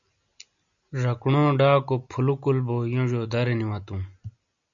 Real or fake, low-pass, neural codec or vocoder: real; 7.2 kHz; none